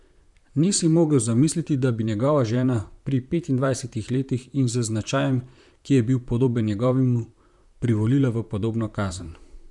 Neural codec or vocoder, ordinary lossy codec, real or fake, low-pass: vocoder, 44.1 kHz, 128 mel bands, Pupu-Vocoder; none; fake; 10.8 kHz